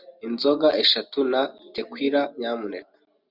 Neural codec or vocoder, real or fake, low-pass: none; real; 5.4 kHz